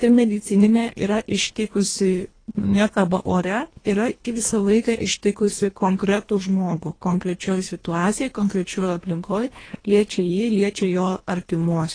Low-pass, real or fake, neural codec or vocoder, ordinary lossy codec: 9.9 kHz; fake; codec, 24 kHz, 1.5 kbps, HILCodec; AAC, 32 kbps